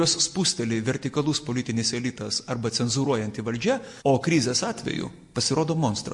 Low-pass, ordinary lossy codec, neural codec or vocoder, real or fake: 10.8 kHz; MP3, 48 kbps; none; real